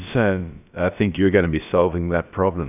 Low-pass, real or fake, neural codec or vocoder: 3.6 kHz; fake; codec, 16 kHz, about 1 kbps, DyCAST, with the encoder's durations